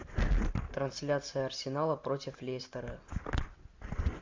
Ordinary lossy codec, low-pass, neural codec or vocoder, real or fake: MP3, 48 kbps; 7.2 kHz; none; real